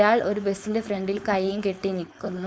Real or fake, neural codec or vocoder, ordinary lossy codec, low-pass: fake; codec, 16 kHz, 4.8 kbps, FACodec; none; none